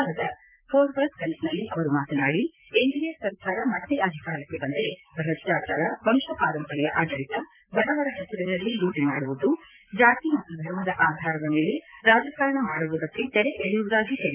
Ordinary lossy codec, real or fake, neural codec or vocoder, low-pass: none; fake; vocoder, 44.1 kHz, 128 mel bands, Pupu-Vocoder; 3.6 kHz